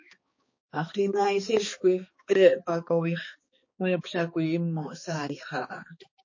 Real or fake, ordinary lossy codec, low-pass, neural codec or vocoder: fake; MP3, 32 kbps; 7.2 kHz; codec, 16 kHz, 2 kbps, X-Codec, HuBERT features, trained on balanced general audio